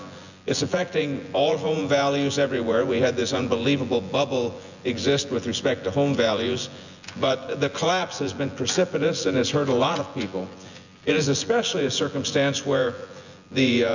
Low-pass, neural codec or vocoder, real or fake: 7.2 kHz; vocoder, 24 kHz, 100 mel bands, Vocos; fake